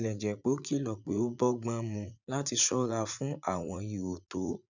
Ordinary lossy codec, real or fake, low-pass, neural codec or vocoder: none; fake; 7.2 kHz; vocoder, 44.1 kHz, 80 mel bands, Vocos